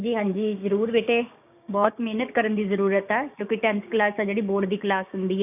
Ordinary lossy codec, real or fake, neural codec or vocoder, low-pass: none; fake; codec, 16 kHz, 6 kbps, DAC; 3.6 kHz